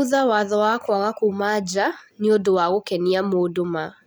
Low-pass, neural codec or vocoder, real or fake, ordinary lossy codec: none; none; real; none